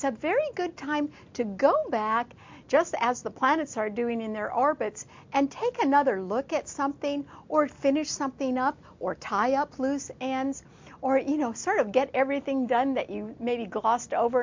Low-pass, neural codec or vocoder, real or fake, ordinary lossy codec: 7.2 kHz; none; real; MP3, 48 kbps